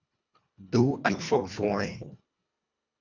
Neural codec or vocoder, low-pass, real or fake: codec, 24 kHz, 1.5 kbps, HILCodec; 7.2 kHz; fake